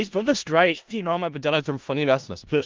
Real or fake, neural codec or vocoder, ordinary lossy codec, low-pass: fake; codec, 16 kHz in and 24 kHz out, 0.4 kbps, LongCat-Audio-Codec, four codebook decoder; Opus, 24 kbps; 7.2 kHz